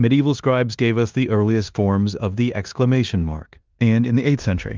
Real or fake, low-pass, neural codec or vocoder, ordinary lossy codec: fake; 7.2 kHz; codec, 16 kHz in and 24 kHz out, 0.9 kbps, LongCat-Audio-Codec, four codebook decoder; Opus, 24 kbps